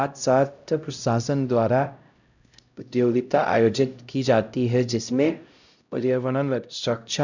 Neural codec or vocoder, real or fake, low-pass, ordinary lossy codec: codec, 16 kHz, 0.5 kbps, X-Codec, HuBERT features, trained on LibriSpeech; fake; 7.2 kHz; none